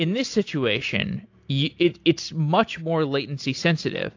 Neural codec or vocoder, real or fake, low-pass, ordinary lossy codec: none; real; 7.2 kHz; AAC, 48 kbps